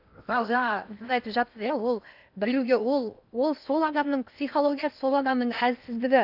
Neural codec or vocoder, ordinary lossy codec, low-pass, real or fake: codec, 16 kHz in and 24 kHz out, 0.8 kbps, FocalCodec, streaming, 65536 codes; none; 5.4 kHz; fake